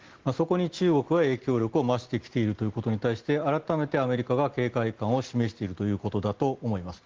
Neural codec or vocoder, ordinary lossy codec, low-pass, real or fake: none; Opus, 16 kbps; 7.2 kHz; real